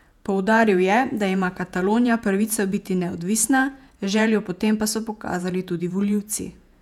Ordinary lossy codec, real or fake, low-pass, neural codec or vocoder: none; fake; 19.8 kHz; vocoder, 48 kHz, 128 mel bands, Vocos